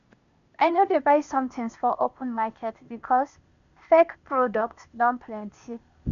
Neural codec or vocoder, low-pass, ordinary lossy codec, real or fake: codec, 16 kHz, 0.8 kbps, ZipCodec; 7.2 kHz; none; fake